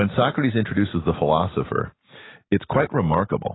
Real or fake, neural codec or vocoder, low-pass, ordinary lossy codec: real; none; 7.2 kHz; AAC, 16 kbps